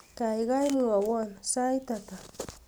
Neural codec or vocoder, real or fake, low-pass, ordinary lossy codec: none; real; none; none